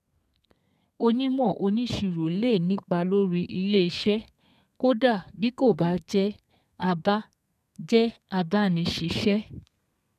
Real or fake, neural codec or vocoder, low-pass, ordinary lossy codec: fake; codec, 32 kHz, 1.9 kbps, SNAC; 14.4 kHz; none